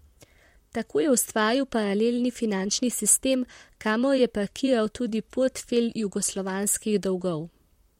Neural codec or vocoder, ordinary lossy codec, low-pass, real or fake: vocoder, 44.1 kHz, 128 mel bands, Pupu-Vocoder; MP3, 64 kbps; 19.8 kHz; fake